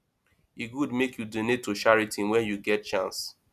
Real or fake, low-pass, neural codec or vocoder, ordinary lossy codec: real; 14.4 kHz; none; none